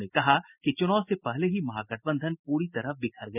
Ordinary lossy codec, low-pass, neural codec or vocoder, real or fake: none; 3.6 kHz; none; real